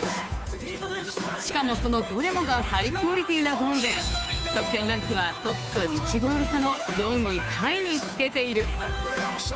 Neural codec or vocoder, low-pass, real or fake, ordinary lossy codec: codec, 16 kHz, 2 kbps, FunCodec, trained on Chinese and English, 25 frames a second; none; fake; none